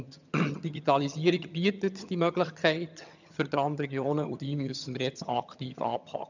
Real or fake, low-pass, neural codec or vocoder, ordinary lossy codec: fake; 7.2 kHz; vocoder, 22.05 kHz, 80 mel bands, HiFi-GAN; none